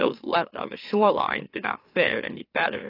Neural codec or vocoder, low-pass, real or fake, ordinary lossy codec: autoencoder, 44.1 kHz, a latent of 192 numbers a frame, MeloTTS; 5.4 kHz; fake; AAC, 24 kbps